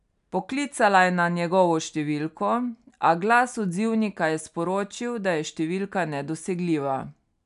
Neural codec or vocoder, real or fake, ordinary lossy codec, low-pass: none; real; none; 10.8 kHz